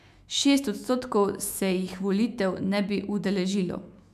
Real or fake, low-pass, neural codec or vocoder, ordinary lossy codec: fake; 14.4 kHz; autoencoder, 48 kHz, 128 numbers a frame, DAC-VAE, trained on Japanese speech; none